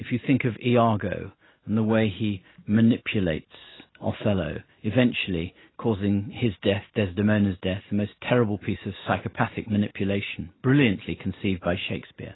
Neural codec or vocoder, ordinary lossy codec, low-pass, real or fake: none; AAC, 16 kbps; 7.2 kHz; real